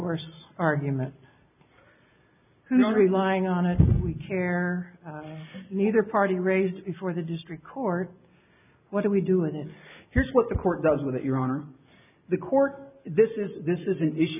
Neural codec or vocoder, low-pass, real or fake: none; 3.6 kHz; real